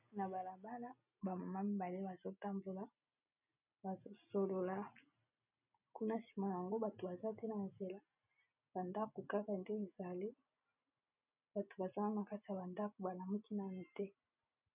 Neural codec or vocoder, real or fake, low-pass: none; real; 3.6 kHz